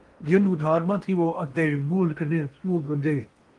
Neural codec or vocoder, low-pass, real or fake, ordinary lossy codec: codec, 16 kHz in and 24 kHz out, 0.8 kbps, FocalCodec, streaming, 65536 codes; 10.8 kHz; fake; Opus, 24 kbps